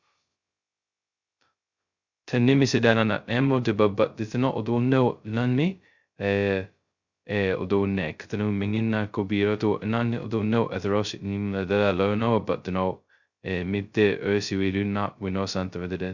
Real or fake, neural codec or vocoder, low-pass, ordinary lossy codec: fake; codec, 16 kHz, 0.2 kbps, FocalCodec; 7.2 kHz; Opus, 64 kbps